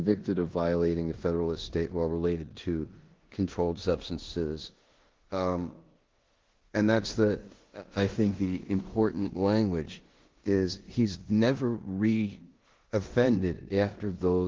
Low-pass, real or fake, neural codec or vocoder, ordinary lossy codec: 7.2 kHz; fake; codec, 16 kHz in and 24 kHz out, 0.9 kbps, LongCat-Audio-Codec, four codebook decoder; Opus, 16 kbps